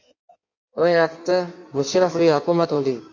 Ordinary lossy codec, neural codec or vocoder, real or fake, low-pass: MP3, 64 kbps; codec, 16 kHz in and 24 kHz out, 1.1 kbps, FireRedTTS-2 codec; fake; 7.2 kHz